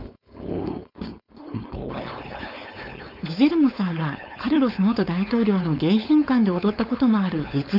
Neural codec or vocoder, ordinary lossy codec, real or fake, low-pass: codec, 16 kHz, 4.8 kbps, FACodec; none; fake; 5.4 kHz